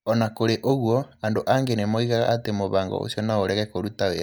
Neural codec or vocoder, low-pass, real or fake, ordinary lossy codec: none; none; real; none